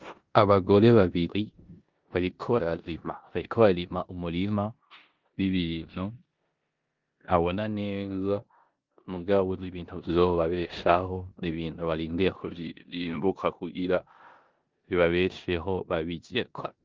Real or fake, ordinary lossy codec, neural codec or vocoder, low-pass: fake; Opus, 32 kbps; codec, 16 kHz in and 24 kHz out, 0.9 kbps, LongCat-Audio-Codec, four codebook decoder; 7.2 kHz